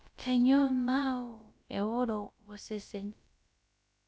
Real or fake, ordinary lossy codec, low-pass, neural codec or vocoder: fake; none; none; codec, 16 kHz, about 1 kbps, DyCAST, with the encoder's durations